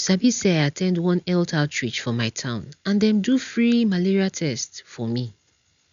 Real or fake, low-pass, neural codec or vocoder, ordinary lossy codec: real; 7.2 kHz; none; none